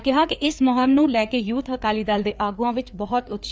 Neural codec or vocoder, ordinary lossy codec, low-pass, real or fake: codec, 16 kHz, 4 kbps, FreqCodec, larger model; none; none; fake